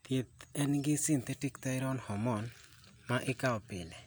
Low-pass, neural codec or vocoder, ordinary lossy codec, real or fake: none; none; none; real